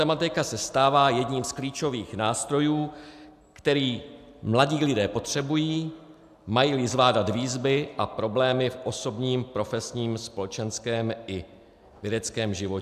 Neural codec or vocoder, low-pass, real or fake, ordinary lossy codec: none; 14.4 kHz; real; AAC, 96 kbps